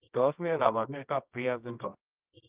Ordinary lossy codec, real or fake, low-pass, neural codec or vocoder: Opus, 24 kbps; fake; 3.6 kHz; codec, 24 kHz, 0.9 kbps, WavTokenizer, medium music audio release